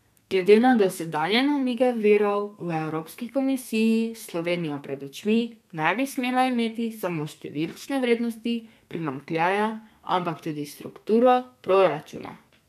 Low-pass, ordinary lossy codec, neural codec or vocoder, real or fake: 14.4 kHz; none; codec, 32 kHz, 1.9 kbps, SNAC; fake